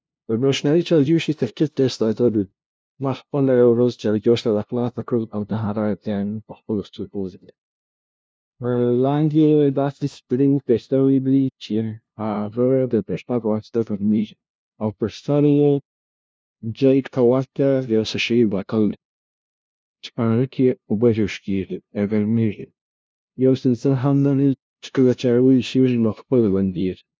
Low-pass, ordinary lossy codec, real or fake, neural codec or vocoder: none; none; fake; codec, 16 kHz, 0.5 kbps, FunCodec, trained on LibriTTS, 25 frames a second